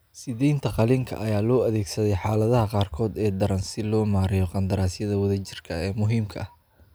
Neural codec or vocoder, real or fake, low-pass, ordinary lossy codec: none; real; none; none